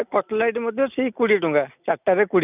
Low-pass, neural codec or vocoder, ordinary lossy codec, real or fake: 3.6 kHz; none; none; real